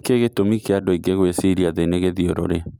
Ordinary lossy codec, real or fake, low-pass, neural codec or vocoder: none; fake; none; vocoder, 44.1 kHz, 128 mel bands every 256 samples, BigVGAN v2